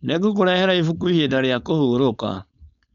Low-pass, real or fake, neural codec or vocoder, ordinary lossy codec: 7.2 kHz; fake; codec, 16 kHz, 4.8 kbps, FACodec; MP3, 64 kbps